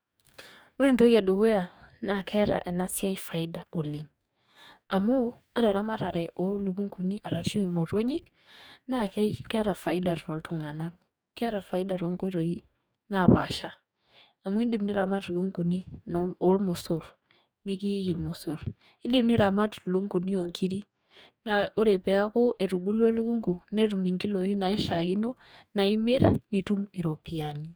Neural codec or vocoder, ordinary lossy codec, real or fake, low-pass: codec, 44.1 kHz, 2.6 kbps, DAC; none; fake; none